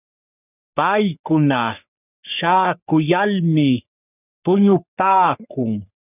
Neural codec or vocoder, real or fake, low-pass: codec, 44.1 kHz, 3.4 kbps, Pupu-Codec; fake; 3.6 kHz